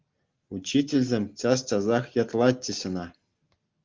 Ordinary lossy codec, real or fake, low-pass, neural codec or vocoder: Opus, 32 kbps; real; 7.2 kHz; none